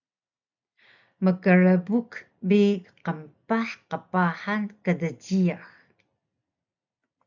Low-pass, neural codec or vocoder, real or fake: 7.2 kHz; none; real